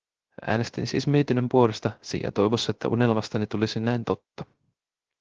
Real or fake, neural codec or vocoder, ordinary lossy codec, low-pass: fake; codec, 16 kHz, 0.3 kbps, FocalCodec; Opus, 16 kbps; 7.2 kHz